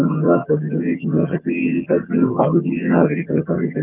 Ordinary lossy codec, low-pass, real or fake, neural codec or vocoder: Opus, 24 kbps; 3.6 kHz; fake; vocoder, 22.05 kHz, 80 mel bands, HiFi-GAN